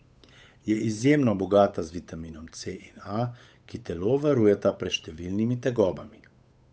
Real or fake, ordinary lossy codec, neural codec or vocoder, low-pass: fake; none; codec, 16 kHz, 8 kbps, FunCodec, trained on Chinese and English, 25 frames a second; none